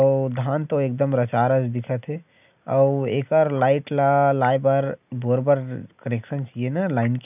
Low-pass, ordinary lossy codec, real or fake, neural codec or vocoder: 3.6 kHz; none; real; none